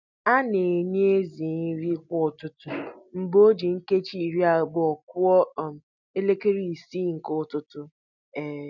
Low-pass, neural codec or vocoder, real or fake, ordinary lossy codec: 7.2 kHz; none; real; none